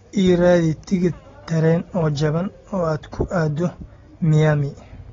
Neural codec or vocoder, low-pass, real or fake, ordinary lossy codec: none; 7.2 kHz; real; AAC, 24 kbps